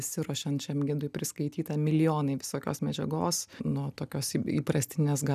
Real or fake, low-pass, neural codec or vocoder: real; 14.4 kHz; none